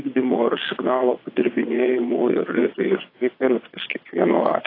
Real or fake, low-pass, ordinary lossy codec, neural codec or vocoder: fake; 5.4 kHz; AAC, 24 kbps; vocoder, 22.05 kHz, 80 mel bands, WaveNeXt